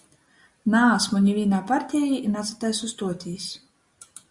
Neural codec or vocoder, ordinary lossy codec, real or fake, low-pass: none; Opus, 64 kbps; real; 10.8 kHz